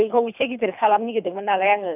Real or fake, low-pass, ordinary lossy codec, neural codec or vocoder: fake; 3.6 kHz; none; codec, 24 kHz, 3 kbps, HILCodec